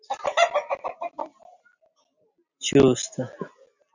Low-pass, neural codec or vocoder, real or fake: 7.2 kHz; none; real